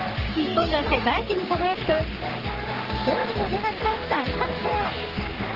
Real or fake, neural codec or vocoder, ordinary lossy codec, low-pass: fake; codec, 44.1 kHz, 3.4 kbps, Pupu-Codec; Opus, 16 kbps; 5.4 kHz